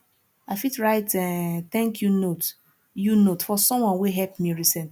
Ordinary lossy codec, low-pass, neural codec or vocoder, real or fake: none; none; none; real